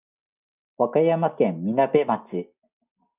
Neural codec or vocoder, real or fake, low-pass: none; real; 3.6 kHz